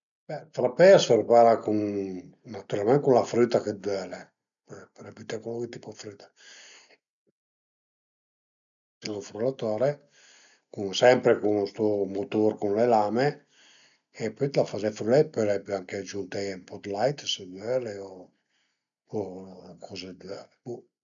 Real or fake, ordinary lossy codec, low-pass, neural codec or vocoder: real; none; 7.2 kHz; none